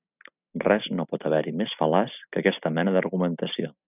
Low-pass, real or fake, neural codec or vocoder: 3.6 kHz; real; none